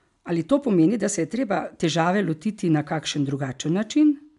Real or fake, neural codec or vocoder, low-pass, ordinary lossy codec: real; none; 10.8 kHz; MP3, 96 kbps